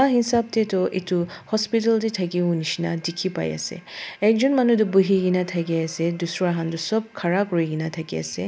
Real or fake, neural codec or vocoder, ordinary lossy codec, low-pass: real; none; none; none